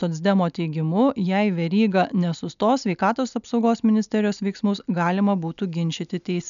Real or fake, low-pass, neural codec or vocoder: real; 7.2 kHz; none